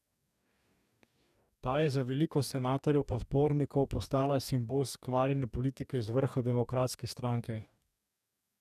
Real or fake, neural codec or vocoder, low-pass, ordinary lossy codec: fake; codec, 44.1 kHz, 2.6 kbps, DAC; 14.4 kHz; none